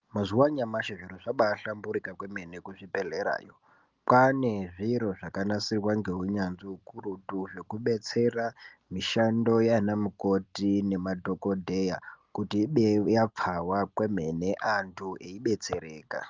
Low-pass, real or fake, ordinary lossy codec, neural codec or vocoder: 7.2 kHz; real; Opus, 32 kbps; none